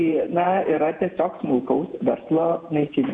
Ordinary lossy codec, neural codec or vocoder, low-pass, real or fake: MP3, 96 kbps; vocoder, 48 kHz, 128 mel bands, Vocos; 10.8 kHz; fake